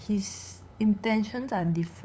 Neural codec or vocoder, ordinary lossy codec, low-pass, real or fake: codec, 16 kHz, 8 kbps, FunCodec, trained on LibriTTS, 25 frames a second; none; none; fake